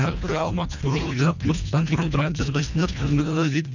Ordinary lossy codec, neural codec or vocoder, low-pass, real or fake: none; codec, 24 kHz, 1.5 kbps, HILCodec; 7.2 kHz; fake